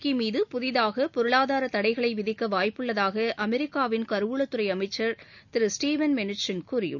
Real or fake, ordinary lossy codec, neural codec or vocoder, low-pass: real; none; none; 7.2 kHz